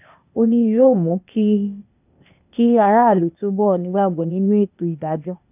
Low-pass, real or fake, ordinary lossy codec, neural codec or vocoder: 3.6 kHz; fake; none; codec, 16 kHz, 0.8 kbps, ZipCodec